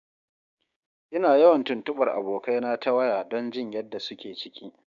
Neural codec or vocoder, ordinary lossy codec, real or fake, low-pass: codec, 16 kHz, 6 kbps, DAC; none; fake; 7.2 kHz